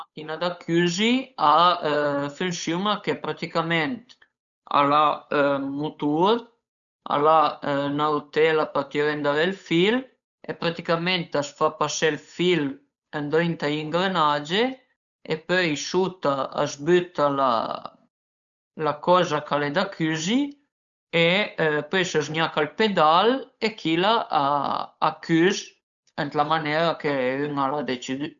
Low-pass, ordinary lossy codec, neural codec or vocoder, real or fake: 7.2 kHz; none; codec, 16 kHz, 8 kbps, FunCodec, trained on Chinese and English, 25 frames a second; fake